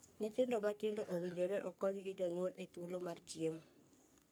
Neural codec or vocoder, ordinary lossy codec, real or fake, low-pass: codec, 44.1 kHz, 3.4 kbps, Pupu-Codec; none; fake; none